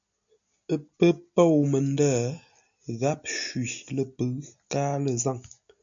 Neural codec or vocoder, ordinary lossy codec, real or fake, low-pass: none; MP3, 64 kbps; real; 7.2 kHz